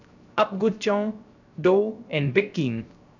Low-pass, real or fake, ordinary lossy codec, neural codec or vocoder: 7.2 kHz; fake; none; codec, 16 kHz, 0.3 kbps, FocalCodec